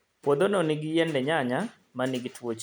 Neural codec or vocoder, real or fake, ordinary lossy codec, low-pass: none; real; none; none